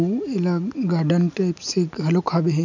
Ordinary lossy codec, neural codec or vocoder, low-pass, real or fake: none; none; 7.2 kHz; real